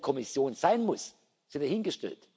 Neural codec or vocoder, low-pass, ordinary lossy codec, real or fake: none; none; none; real